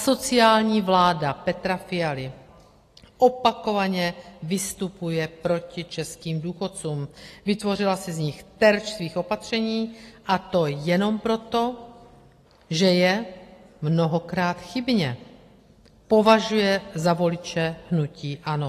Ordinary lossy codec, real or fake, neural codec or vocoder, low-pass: AAC, 48 kbps; real; none; 14.4 kHz